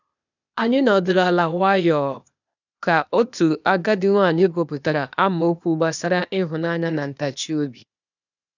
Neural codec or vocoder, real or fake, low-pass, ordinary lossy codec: codec, 16 kHz, 0.8 kbps, ZipCodec; fake; 7.2 kHz; none